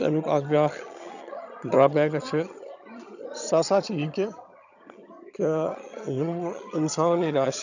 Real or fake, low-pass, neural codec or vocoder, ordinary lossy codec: fake; 7.2 kHz; vocoder, 22.05 kHz, 80 mel bands, HiFi-GAN; none